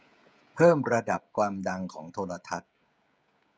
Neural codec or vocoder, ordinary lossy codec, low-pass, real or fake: codec, 16 kHz, 16 kbps, FreqCodec, smaller model; none; none; fake